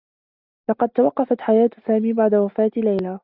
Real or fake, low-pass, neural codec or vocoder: real; 5.4 kHz; none